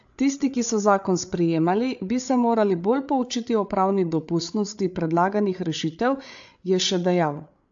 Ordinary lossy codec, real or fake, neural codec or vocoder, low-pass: AAC, 64 kbps; fake; codec, 16 kHz, 8 kbps, FreqCodec, larger model; 7.2 kHz